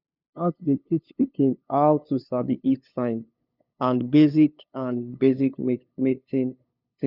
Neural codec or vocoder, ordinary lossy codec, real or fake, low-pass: codec, 16 kHz, 2 kbps, FunCodec, trained on LibriTTS, 25 frames a second; none; fake; 5.4 kHz